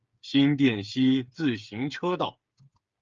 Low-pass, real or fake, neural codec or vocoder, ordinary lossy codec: 7.2 kHz; fake; codec, 16 kHz, 8 kbps, FreqCodec, smaller model; Opus, 24 kbps